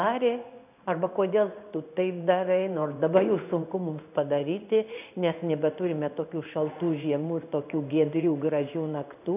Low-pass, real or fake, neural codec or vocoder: 3.6 kHz; fake; codec, 16 kHz in and 24 kHz out, 1 kbps, XY-Tokenizer